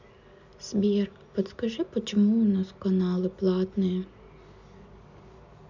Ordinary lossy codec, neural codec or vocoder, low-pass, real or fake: none; none; 7.2 kHz; real